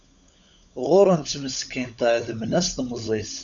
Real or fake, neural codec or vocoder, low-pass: fake; codec, 16 kHz, 16 kbps, FunCodec, trained on LibriTTS, 50 frames a second; 7.2 kHz